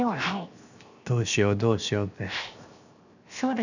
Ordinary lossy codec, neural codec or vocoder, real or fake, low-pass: none; codec, 16 kHz, 0.7 kbps, FocalCodec; fake; 7.2 kHz